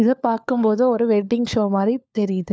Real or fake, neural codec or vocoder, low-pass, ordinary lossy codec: fake; codec, 16 kHz, 4 kbps, FunCodec, trained on LibriTTS, 50 frames a second; none; none